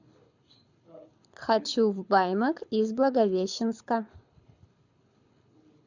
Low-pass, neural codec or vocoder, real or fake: 7.2 kHz; codec, 24 kHz, 6 kbps, HILCodec; fake